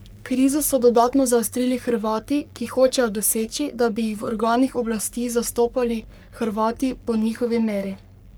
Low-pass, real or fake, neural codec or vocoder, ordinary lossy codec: none; fake; codec, 44.1 kHz, 3.4 kbps, Pupu-Codec; none